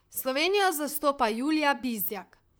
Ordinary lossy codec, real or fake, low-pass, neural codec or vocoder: none; fake; none; vocoder, 44.1 kHz, 128 mel bands, Pupu-Vocoder